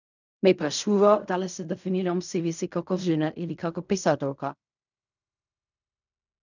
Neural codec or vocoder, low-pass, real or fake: codec, 16 kHz in and 24 kHz out, 0.4 kbps, LongCat-Audio-Codec, fine tuned four codebook decoder; 7.2 kHz; fake